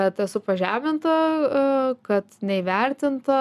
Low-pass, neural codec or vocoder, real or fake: 14.4 kHz; none; real